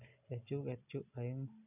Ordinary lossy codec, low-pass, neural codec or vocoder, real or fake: none; 3.6 kHz; none; real